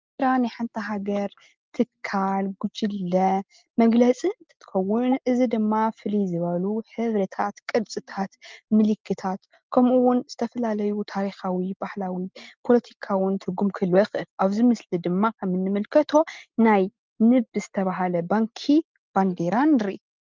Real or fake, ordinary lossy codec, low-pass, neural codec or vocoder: real; Opus, 32 kbps; 7.2 kHz; none